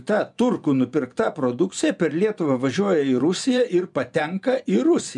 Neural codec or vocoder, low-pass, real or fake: vocoder, 24 kHz, 100 mel bands, Vocos; 10.8 kHz; fake